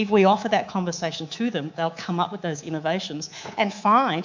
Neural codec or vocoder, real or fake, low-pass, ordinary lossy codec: codec, 24 kHz, 3.1 kbps, DualCodec; fake; 7.2 kHz; MP3, 64 kbps